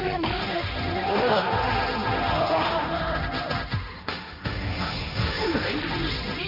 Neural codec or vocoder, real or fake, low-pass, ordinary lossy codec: codec, 16 kHz, 1.1 kbps, Voila-Tokenizer; fake; 5.4 kHz; none